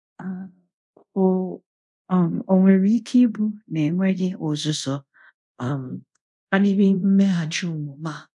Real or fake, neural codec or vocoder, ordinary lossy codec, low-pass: fake; codec, 24 kHz, 0.5 kbps, DualCodec; none; 10.8 kHz